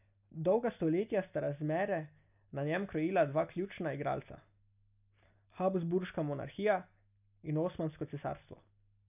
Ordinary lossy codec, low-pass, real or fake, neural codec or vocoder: none; 3.6 kHz; real; none